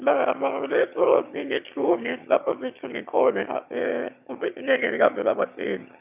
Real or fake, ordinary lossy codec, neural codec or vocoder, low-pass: fake; none; autoencoder, 22.05 kHz, a latent of 192 numbers a frame, VITS, trained on one speaker; 3.6 kHz